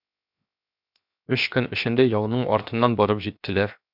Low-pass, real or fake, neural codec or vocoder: 5.4 kHz; fake; codec, 16 kHz, 0.7 kbps, FocalCodec